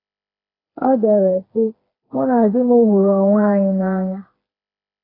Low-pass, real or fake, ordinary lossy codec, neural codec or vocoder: 5.4 kHz; fake; AAC, 24 kbps; codec, 16 kHz, 4 kbps, FreqCodec, smaller model